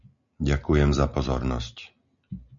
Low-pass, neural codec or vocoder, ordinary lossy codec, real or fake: 7.2 kHz; none; MP3, 96 kbps; real